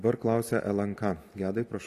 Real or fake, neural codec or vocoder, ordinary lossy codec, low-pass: real; none; AAC, 48 kbps; 14.4 kHz